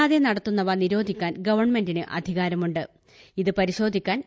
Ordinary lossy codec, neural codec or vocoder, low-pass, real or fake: none; none; none; real